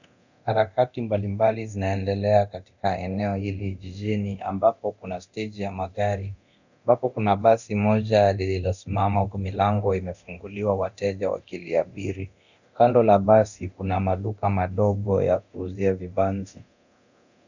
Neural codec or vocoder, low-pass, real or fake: codec, 24 kHz, 0.9 kbps, DualCodec; 7.2 kHz; fake